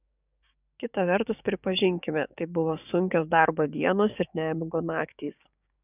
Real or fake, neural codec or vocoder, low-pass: fake; codec, 16 kHz, 6 kbps, DAC; 3.6 kHz